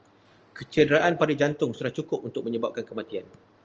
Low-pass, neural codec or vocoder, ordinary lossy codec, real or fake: 7.2 kHz; none; Opus, 24 kbps; real